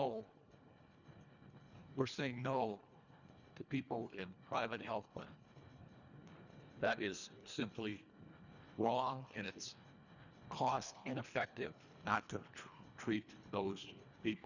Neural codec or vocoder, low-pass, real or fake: codec, 24 kHz, 1.5 kbps, HILCodec; 7.2 kHz; fake